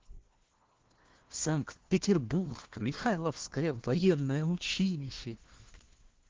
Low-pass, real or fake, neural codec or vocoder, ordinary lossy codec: 7.2 kHz; fake; codec, 16 kHz, 1 kbps, FunCodec, trained on LibriTTS, 50 frames a second; Opus, 16 kbps